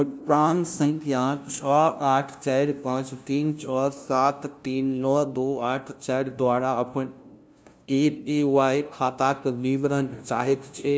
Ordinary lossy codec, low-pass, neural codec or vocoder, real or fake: none; none; codec, 16 kHz, 0.5 kbps, FunCodec, trained on LibriTTS, 25 frames a second; fake